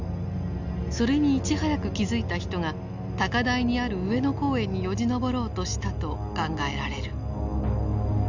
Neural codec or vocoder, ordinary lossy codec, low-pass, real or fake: none; none; 7.2 kHz; real